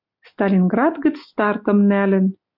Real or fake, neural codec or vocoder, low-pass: real; none; 5.4 kHz